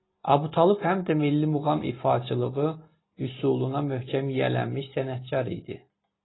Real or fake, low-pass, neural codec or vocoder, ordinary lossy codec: real; 7.2 kHz; none; AAC, 16 kbps